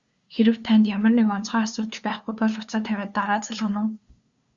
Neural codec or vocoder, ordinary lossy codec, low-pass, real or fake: codec, 16 kHz, 2 kbps, FunCodec, trained on LibriTTS, 25 frames a second; Opus, 64 kbps; 7.2 kHz; fake